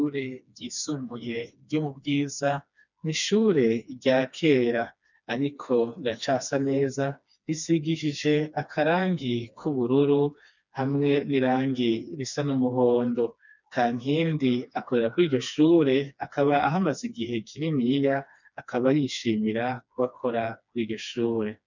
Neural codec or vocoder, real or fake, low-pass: codec, 16 kHz, 2 kbps, FreqCodec, smaller model; fake; 7.2 kHz